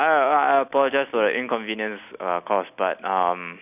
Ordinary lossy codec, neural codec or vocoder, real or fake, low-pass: none; none; real; 3.6 kHz